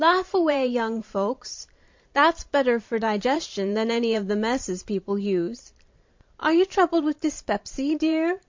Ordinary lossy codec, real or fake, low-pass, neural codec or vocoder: MP3, 48 kbps; fake; 7.2 kHz; vocoder, 44.1 kHz, 128 mel bands every 512 samples, BigVGAN v2